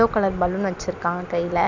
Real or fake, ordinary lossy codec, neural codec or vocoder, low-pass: fake; none; vocoder, 44.1 kHz, 128 mel bands every 256 samples, BigVGAN v2; 7.2 kHz